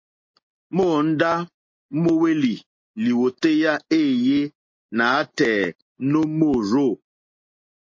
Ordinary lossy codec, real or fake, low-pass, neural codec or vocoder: MP3, 32 kbps; real; 7.2 kHz; none